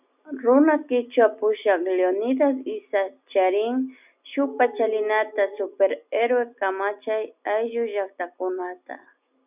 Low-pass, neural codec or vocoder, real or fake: 3.6 kHz; none; real